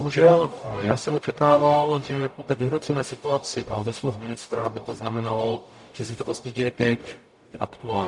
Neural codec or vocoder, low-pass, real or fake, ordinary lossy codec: codec, 44.1 kHz, 0.9 kbps, DAC; 10.8 kHz; fake; MP3, 96 kbps